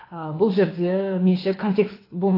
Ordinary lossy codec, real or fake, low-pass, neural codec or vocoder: AAC, 24 kbps; fake; 5.4 kHz; codec, 24 kHz, 6 kbps, HILCodec